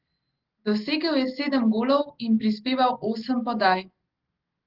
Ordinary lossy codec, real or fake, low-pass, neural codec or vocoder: Opus, 16 kbps; real; 5.4 kHz; none